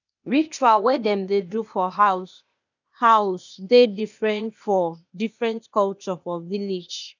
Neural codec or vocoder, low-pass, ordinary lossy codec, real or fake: codec, 16 kHz, 0.8 kbps, ZipCodec; 7.2 kHz; none; fake